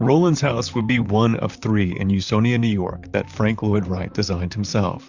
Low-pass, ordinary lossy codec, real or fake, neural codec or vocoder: 7.2 kHz; Opus, 64 kbps; fake; vocoder, 44.1 kHz, 128 mel bands, Pupu-Vocoder